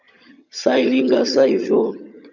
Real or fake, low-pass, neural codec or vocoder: fake; 7.2 kHz; vocoder, 22.05 kHz, 80 mel bands, HiFi-GAN